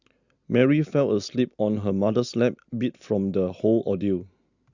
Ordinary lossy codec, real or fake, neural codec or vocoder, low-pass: Opus, 64 kbps; real; none; 7.2 kHz